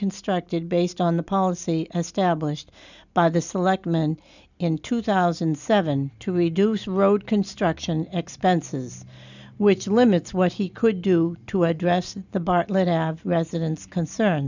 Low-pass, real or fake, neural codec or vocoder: 7.2 kHz; real; none